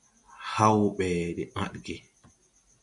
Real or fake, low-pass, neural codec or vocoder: real; 10.8 kHz; none